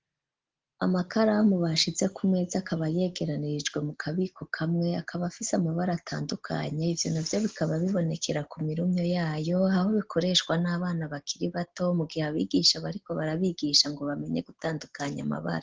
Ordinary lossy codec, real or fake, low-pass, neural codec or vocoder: Opus, 16 kbps; real; 7.2 kHz; none